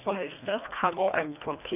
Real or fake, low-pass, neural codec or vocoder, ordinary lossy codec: fake; 3.6 kHz; codec, 24 kHz, 1.5 kbps, HILCodec; none